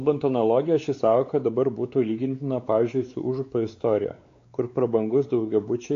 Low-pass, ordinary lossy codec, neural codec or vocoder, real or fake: 7.2 kHz; AAC, 48 kbps; codec, 16 kHz, 4 kbps, X-Codec, WavLM features, trained on Multilingual LibriSpeech; fake